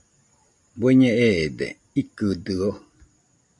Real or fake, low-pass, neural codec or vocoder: real; 10.8 kHz; none